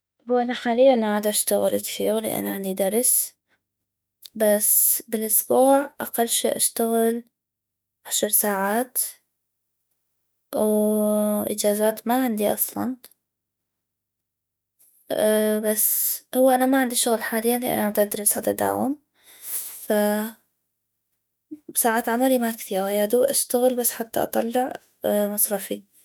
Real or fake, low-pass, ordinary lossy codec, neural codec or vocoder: fake; none; none; autoencoder, 48 kHz, 32 numbers a frame, DAC-VAE, trained on Japanese speech